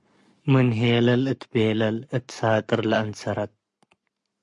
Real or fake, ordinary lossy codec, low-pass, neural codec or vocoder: fake; MP3, 48 kbps; 10.8 kHz; codec, 44.1 kHz, 7.8 kbps, Pupu-Codec